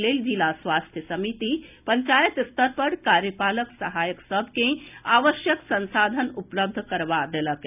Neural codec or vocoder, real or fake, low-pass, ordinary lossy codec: none; real; 3.6 kHz; none